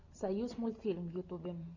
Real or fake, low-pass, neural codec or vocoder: real; 7.2 kHz; none